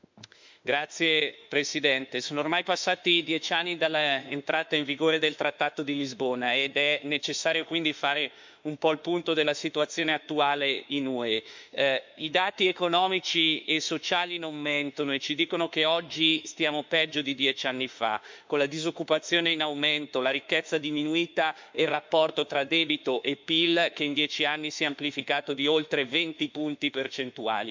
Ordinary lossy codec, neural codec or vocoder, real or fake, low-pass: MP3, 64 kbps; autoencoder, 48 kHz, 32 numbers a frame, DAC-VAE, trained on Japanese speech; fake; 7.2 kHz